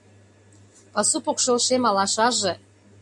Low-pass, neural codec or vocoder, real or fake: 10.8 kHz; none; real